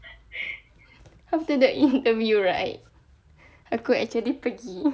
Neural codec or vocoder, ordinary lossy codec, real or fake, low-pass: none; none; real; none